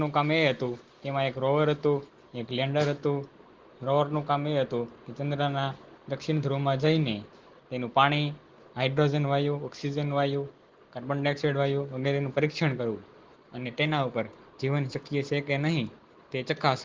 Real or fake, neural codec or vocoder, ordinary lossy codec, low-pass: real; none; Opus, 16 kbps; 7.2 kHz